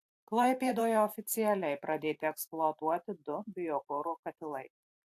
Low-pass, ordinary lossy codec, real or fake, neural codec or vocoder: 14.4 kHz; AAC, 64 kbps; fake; vocoder, 44.1 kHz, 128 mel bands every 512 samples, BigVGAN v2